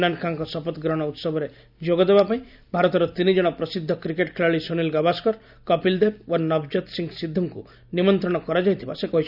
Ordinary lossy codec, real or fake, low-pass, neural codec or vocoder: none; real; 5.4 kHz; none